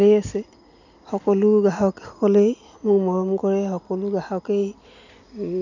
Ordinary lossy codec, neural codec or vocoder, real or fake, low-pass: none; none; real; 7.2 kHz